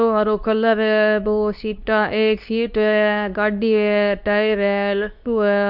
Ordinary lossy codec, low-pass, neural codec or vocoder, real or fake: none; 5.4 kHz; codec, 24 kHz, 0.9 kbps, WavTokenizer, medium speech release version 2; fake